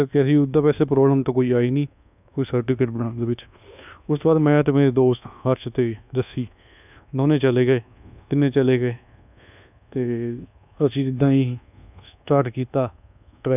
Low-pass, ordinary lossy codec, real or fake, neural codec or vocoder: 3.6 kHz; none; fake; codec, 24 kHz, 1.2 kbps, DualCodec